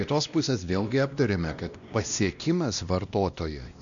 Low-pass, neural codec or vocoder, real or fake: 7.2 kHz; codec, 16 kHz, 1 kbps, X-Codec, WavLM features, trained on Multilingual LibriSpeech; fake